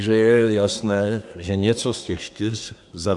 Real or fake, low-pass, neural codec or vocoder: fake; 10.8 kHz; codec, 24 kHz, 1 kbps, SNAC